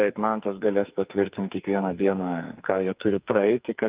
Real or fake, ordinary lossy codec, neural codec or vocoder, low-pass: fake; Opus, 32 kbps; codec, 44.1 kHz, 2.6 kbps, SNAC; 3.6 kHz